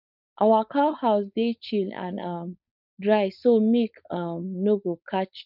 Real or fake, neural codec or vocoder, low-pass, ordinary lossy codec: fake; codec, 16 kHz, 4.8 kbps, FACodec; 5.4 kHz; none